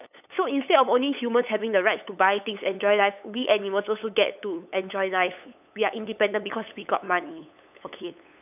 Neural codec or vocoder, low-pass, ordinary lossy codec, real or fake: codec, 16 kHz, 8 kbps, FunCodec, trained on LibriTTS, 25 frames a second; 3.6 kHz; none; fake